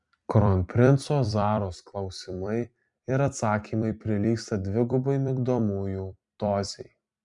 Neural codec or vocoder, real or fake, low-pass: vocoder, 44.1 kHz, 128 mel bands every 256 samples, BigVGAN v2; fake; 10.8 kHz